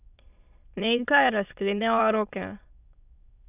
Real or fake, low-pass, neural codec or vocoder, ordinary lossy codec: fake; 3.6 kHz; autoencoder, 22.05 kHz, a latent of 192 numbers a frame, VITS, trained on many speakers; none